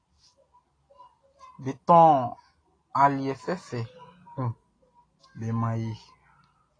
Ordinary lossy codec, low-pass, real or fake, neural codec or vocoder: AAC, 32 kbps; 9.9 kHz; real; none